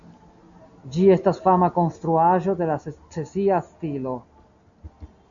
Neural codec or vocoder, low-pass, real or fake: none; 7.2 kHz; real